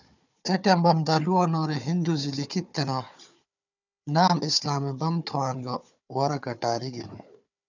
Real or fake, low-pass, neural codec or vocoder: fake; 7.2 kHz; codec, 16 kHz, 4 kbps, FunCodec, trained on Chinese and English, 50 frames a second